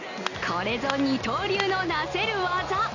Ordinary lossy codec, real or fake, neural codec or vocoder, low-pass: none; real; none; 7.2 kHz